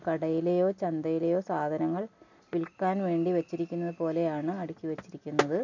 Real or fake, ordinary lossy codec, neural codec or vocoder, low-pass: real; none; none; 7.2 kHz